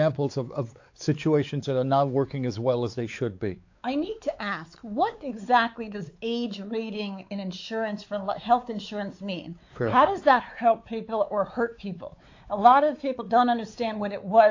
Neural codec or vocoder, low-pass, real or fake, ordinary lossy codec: codec, 16 kHz, 4 kbps, X-Codec, WavLM features, trained on Multilingual LibriSpeech; 7.2 kHz; fake; AAC, 48 kbps